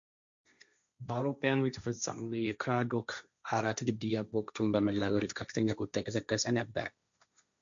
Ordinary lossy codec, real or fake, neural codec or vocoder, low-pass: MP3, 64 kbps; fake; codec, 16 kHz, 1.1 kbps, Voila-Tokenizer; 7.2 kHz